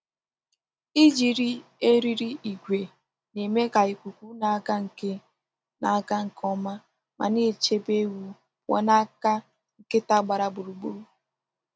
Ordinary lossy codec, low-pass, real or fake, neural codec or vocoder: none; none; real; none